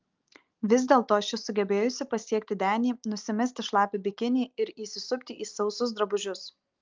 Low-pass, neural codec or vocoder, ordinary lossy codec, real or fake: 7.2 kHz; none; Opus, 24 kbps; real